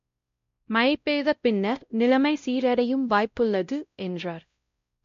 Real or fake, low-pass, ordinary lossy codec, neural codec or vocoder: fake; 7.2 kHz; MP3, 48 kbps; codec, 16 kHz, 0.5 kbps, X-Codec, WavLM features, trained on Multilingual LibriSpeech